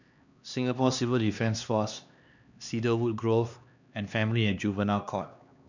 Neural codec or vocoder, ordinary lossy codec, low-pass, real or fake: codec, 16 kHz, 2 kbps, X-Codec, HuBERT features, trained on LibriSpeech; none; 7.2 kHz; fake